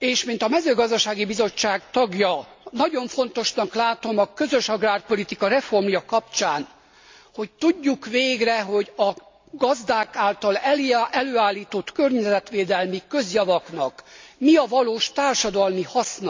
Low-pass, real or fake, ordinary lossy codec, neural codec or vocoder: 7.2 kHz; real; MP3, 64 kbps; none